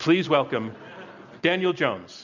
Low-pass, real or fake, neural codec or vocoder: 7.2 kHz; real; none